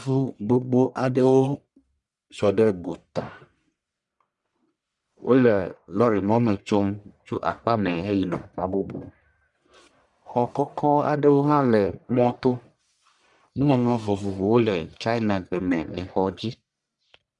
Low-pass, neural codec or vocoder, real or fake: 10.8 kHz; codec, 44.1 kHz, 1.7 kbps, Pupu-Codec; fake